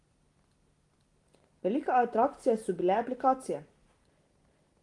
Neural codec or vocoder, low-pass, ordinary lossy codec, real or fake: none; 10.8 kHz; Opus, 24 kbps; real